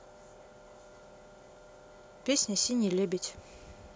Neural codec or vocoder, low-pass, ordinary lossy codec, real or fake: none; none; none; real